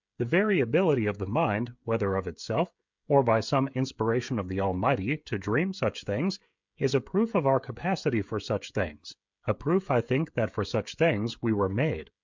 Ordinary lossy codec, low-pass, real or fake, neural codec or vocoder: MP3, 64 kbps; 7.2 kHz; fake; codec, 16 kHz, 16 kbps, FreqCodec, smaller model